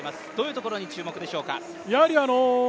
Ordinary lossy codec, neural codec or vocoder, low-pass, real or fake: none; none; none; real